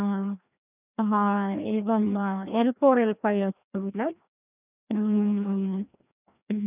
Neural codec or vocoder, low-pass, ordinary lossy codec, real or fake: codec, 16 kHz, 1 kbps, FreqCodec, larger model; 3.6 kHz; none; fake